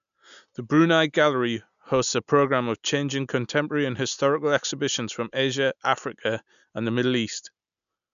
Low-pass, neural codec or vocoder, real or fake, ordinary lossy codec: 7.2 kHz; none; real; none